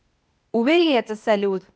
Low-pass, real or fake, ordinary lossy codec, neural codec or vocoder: none; fake; none; codec, 16 kHz, 0.8 kbps, ZipCodec